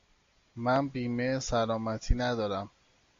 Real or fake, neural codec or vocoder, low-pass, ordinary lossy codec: real; none; 7.2 kHz; AAC, 48 kbps